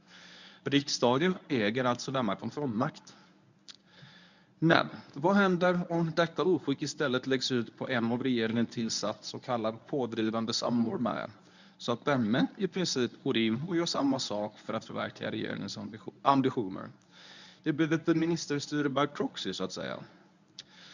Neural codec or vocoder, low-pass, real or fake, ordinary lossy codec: codec, 24 kHz, 0.9 kbps, WavTokenizer, medium speech release version 1; 7.2 kHz; fake; none